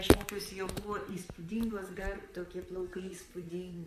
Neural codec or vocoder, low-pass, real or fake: vocoder, 44.1 kHz, 128 mel bands, Pupu-Vocoder; 14.4 kHz; fake